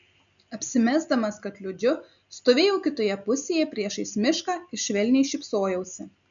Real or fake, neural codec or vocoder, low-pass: real; none; 7.2 kHz